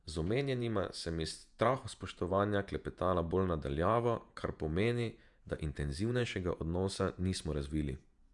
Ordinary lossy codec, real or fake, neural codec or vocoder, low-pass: none; fake; vocoder, 48 kHz, 128 mel bands, Vocos; 10.8 kHz